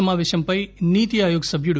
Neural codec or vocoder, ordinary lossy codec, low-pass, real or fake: none; none; none; real